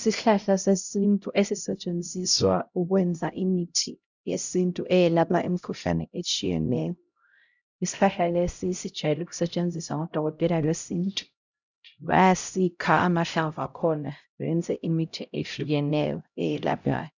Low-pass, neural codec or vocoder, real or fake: 7.2 kHz; codec, 16 kHz, 0.5 kbps, X-Codec, HuBERT features, trained on LibriSpeech; fake